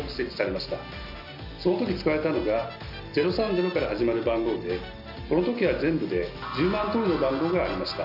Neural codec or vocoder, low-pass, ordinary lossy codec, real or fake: none; 5.4 kHz; none; real